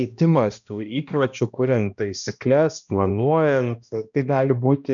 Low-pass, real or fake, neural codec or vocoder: 7.2 kHz; fake; codec, 16 kHz, 1 kbps, X-Codec, HuBERT features, trained on balanced general audio